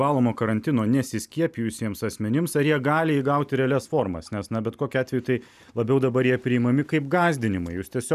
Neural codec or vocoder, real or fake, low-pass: none; real; 14.4 kHz